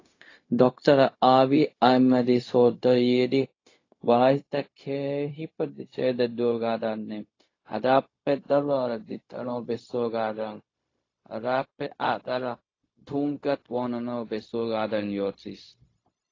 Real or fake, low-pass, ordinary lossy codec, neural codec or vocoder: fake; 7.2 kHz; AAC, 32 kbps; codec, 16 kHz, 0.4 kbps, LongCat-Audio-Codec